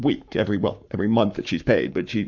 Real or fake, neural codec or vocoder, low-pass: fake; codec, 44.1 kHz, 7.8 kbps, Pupu-Codec; 7.2 kHz